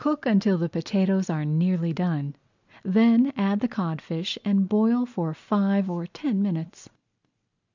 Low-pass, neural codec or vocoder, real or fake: 7.2 kHz; none; real